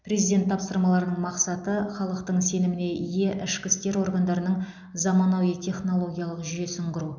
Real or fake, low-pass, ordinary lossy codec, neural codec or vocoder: real; 7.2 kHz; none; none